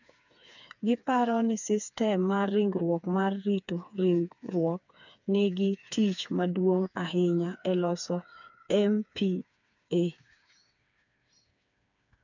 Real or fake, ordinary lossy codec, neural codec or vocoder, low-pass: fake; none; codec, 16 kHz, 4 kbps, FreqCodec, smaller model; 7.2 kHz